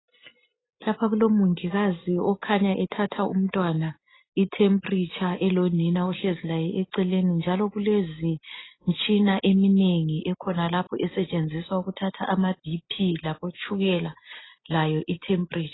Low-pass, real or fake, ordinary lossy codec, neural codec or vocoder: 7.2 kHz; real; AAC, 16 kbps; none